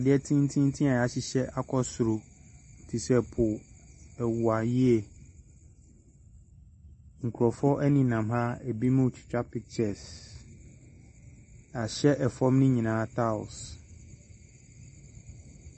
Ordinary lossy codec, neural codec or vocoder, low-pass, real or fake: MP3, 32 kbps; none; 9.9 kHz; real